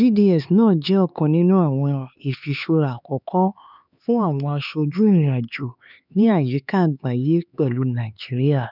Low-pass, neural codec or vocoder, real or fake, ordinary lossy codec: 5.4 kHz; codec, 16 kHz, 4 kbps, X-Codec, HuBERT features, trained on LibriSpeech; fake; none